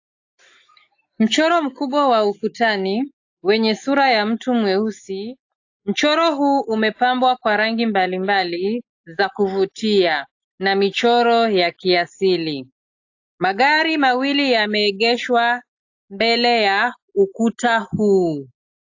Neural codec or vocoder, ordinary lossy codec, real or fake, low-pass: none; AAC, 48 kbps; real; 7.2 kHz